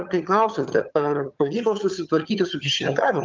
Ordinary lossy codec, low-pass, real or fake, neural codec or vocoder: Opus, 24 kbps; 7.2 kHz; fake; vocoder, 22.05 kHz, 80 mel bands, HiFi-GAN